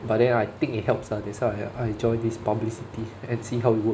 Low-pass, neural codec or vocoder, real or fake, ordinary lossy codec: none; none; real; none